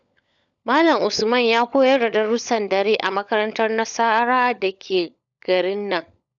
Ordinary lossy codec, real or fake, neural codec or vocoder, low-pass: none; fake; codec, 16 kHz, 16 kbps, FunCodec, trained on LibriTTS, 50 frames a second; 7.2 kHz